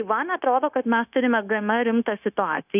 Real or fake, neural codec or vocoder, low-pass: fake; codec, 16 kHz, 0.9 kbps, LongCat-Audio-Codec; 3.6 kHz